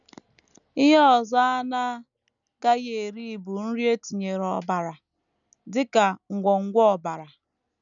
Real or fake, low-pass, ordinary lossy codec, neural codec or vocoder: real; 7.2 kHz; none; none